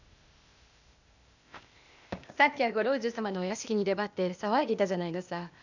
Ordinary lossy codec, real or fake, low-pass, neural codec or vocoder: none; fake; 7.2 kHz; codec, 16 kHz, 0.8 kbps, ZipCodec